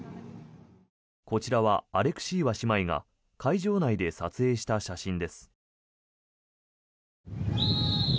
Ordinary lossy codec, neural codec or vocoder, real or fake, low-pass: none; none; real; none